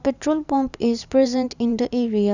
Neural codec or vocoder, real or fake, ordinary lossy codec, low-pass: vocoder, 44.1 kHz, 128 mel bands every 512 samples, BigVGAN v2; fake; none; 7.2 kHz